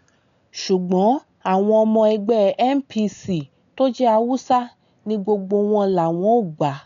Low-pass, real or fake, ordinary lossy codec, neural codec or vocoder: 7.2 kHz; real; MP3, 96 kbps; none